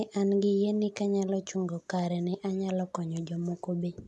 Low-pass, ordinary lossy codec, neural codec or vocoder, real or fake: none; none; none; real